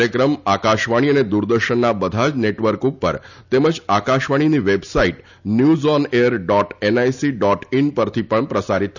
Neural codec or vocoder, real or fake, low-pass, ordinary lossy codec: none; real; 7.2 kHz; none